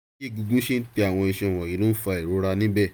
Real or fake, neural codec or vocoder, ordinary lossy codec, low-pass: real; none; none; none